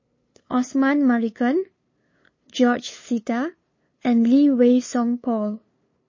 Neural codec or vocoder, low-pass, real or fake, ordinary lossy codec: codec, 16 kHz, 2 kbps, FunCodec, trained on LibriTTS, 25 frames a second; 7.2 kHz; fake; MP3, 32 kbps